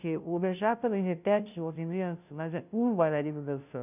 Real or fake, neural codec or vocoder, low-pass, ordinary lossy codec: fake; codec, 16 kHz, 0.5 kbps, FunCodec, trained on Chinese and English, 25 frames a second; 3.6 kHz; none